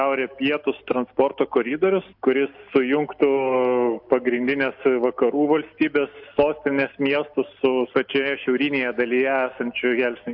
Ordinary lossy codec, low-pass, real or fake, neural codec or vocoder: MP3, 48 kbps; 5.4 kHz; real; none